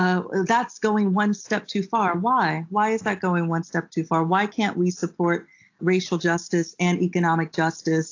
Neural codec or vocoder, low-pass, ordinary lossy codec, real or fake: none; 7.2 kHz; AAC, 48 kbps; real